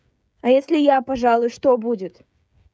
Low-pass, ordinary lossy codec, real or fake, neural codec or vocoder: none; none; fake; codec, 16 kHz, 16 kbps, FreqCodec, smaller model